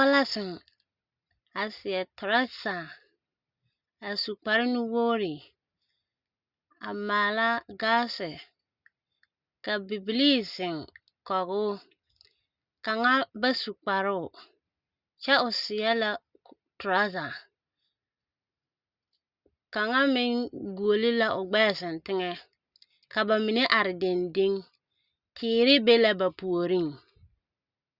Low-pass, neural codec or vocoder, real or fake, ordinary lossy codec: 5.4 kHz; none; real; Opus, 64 kbps